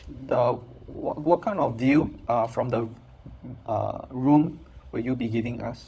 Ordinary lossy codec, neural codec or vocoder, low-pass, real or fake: none; codec, 16 kHz, 16 kbps, FunCodec, trained on LibriTTS, 50 frames a second; none; fake